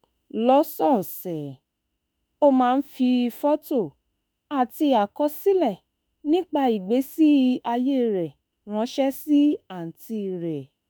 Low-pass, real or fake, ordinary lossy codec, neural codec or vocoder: none; fake; none; autoencoder, 48 kHz, 32 numbers a frame, DAC-VAE, trained on Japanese speech